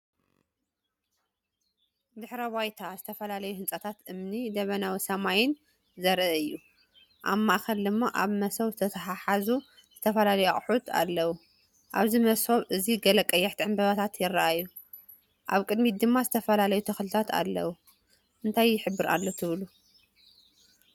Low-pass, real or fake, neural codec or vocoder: 19.8 kHz; real; none